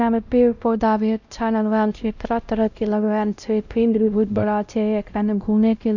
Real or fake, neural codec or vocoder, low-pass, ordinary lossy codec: fake; codec, 16 kHz, 0.5 kbps, X-Codec, WavLM features, trained on Multilingual LibriSpeech; 7.2 kHz; none